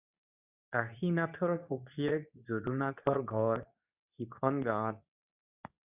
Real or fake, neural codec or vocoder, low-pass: fake; codec, 24 kHz, 0.9 kbps, WavTokenizer, medium speech release version 2; 3.6 kHz